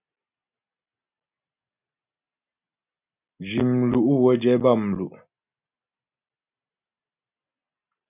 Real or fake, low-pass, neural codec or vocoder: real; 3.6 kHz; none